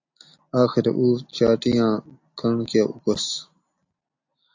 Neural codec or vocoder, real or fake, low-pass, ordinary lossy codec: none; real; 7.2 kHz; AAC, 48 kbps